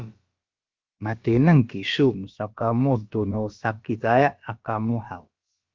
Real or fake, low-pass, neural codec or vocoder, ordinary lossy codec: fake; 7.2 kHz; codec, 16 kHz, about 1 kbps, DyCAST, with the encoder's durations; Opus, 24 kbps